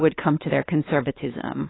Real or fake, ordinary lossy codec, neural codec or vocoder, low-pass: fake; AAC, 16 kbps; codec, 16 kHz, 2 kbps, X-Codec, WavLM features, trained on Multilingual LibriSpeech; 7.2 kHz